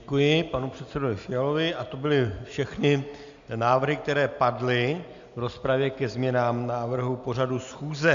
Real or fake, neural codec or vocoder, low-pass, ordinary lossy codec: real; none; 7.2 kHz; MP3, 64 kbps